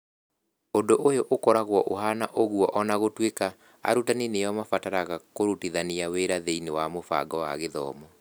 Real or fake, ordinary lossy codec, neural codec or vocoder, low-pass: real; none; none; none